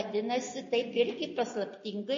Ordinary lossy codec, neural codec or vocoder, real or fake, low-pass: MP3, 32 kbps; none; real; 7.2 kHz